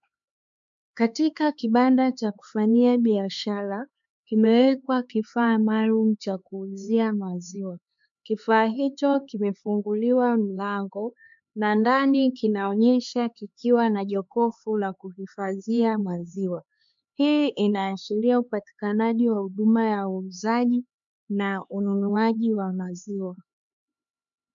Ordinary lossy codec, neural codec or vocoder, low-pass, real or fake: MP3, 64 kbps; codec, 16 kHz, 4 kbps, X-Codec, HuBERT features, trained on LibriSpeech; 7.2 kHz; fake